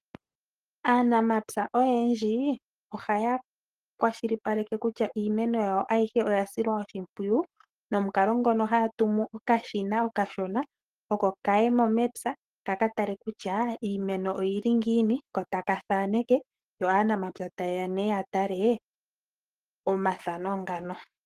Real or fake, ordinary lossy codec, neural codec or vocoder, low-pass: fake; Opus, 24 kbps; codec, 44.1 kHz, 7.8 kbps, Pupu-Codec; 14.4 kHz